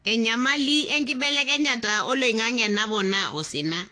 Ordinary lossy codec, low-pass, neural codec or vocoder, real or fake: AAC, 48 kbps; 9.9 kHz; autoencoder, 48 kHz, 32 numbers a frame, DAC-VAE, trained on Japanese speech; fake